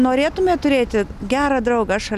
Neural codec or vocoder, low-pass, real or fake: none; 14.4 kHz; real